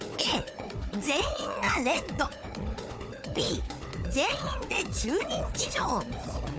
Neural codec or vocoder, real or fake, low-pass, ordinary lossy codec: codec, 16 kHz, 4 kbps, FunCodec, trained on Chinese and English, 50 frames a second; fake; none; none